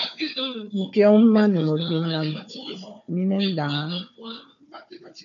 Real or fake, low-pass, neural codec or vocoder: fake; 7.2 kHz; codec, 16 kHz, 4 kbps, FunCodec, trained on Chinese and English, 50 frames a second